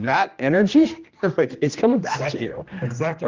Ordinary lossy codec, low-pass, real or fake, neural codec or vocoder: Opus, 32 kbps; 7.2 kHz; fake; codec, 16 kHz, 1 kbps, X-Codec, HuBERT features, trained on general audio